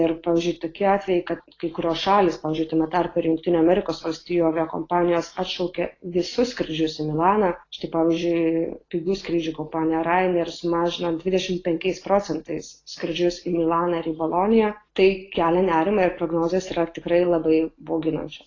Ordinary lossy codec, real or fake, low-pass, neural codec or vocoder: AAC, 32 kbps; real; 7.2 kHz; none